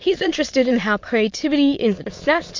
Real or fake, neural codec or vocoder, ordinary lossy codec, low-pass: fake; autoencoder, 22.05 kHz, a latent of 192 numbers a frame, VITS, trained on many speakers; MP3, 48 kbps; 7.2 kHz